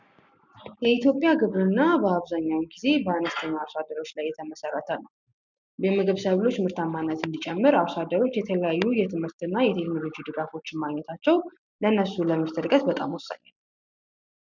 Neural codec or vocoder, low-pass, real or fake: none; 7.2 kHz; real